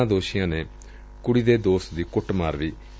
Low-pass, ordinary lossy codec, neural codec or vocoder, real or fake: none; none; none; real